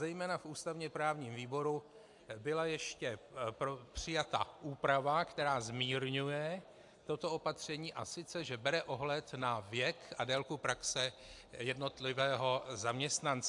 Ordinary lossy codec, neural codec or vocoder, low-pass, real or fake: AAC, 64 kbps; none; 10.8 kHz; real